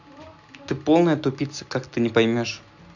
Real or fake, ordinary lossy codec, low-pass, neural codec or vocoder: real; none; 7.2 kHz; none